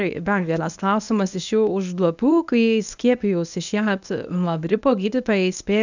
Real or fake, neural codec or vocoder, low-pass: fake; codec, 24 kHz, 0.9 kbps, WavTokenizer, medium speech release version 1; 7.2 kHz